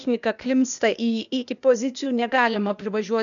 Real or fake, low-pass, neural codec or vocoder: fake; 7.2 kHz; codec, 16 kHz, 0.8 kbps, ZipCodec